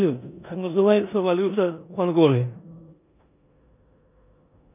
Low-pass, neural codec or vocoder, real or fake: 3.6 kHz; codec, 16 kHz in and 24 kHz out, 0.9 kbps, LongCat-Audio-Codec, four codebook decoder; fake